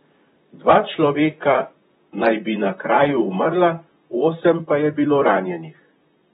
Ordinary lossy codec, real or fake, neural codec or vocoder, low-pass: AAC, 16 kbps; fake; vocoder, 44.1 kHz, 128 mel bands, Pupu-Vocoder; 19.8 kHz